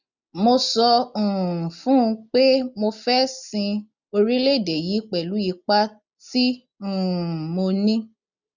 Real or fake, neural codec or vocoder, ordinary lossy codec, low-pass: real; none; none; 7.2 kHz